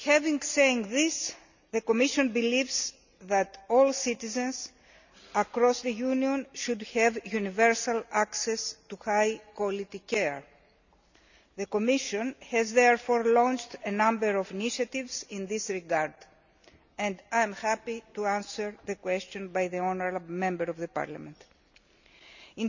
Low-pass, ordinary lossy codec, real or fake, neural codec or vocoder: 7.2 kHz; none; real; none